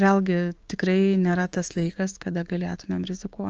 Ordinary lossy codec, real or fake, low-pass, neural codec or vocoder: Opus, 24 kbps; fake; 7.2 kHz; codec, 16 kHz, 6 kbps, DAC